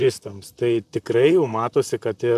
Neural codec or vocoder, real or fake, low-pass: vocoder, 44.1 kHz, 128 mel bands, Pupu-Vocoder; fake; 14.4 kHz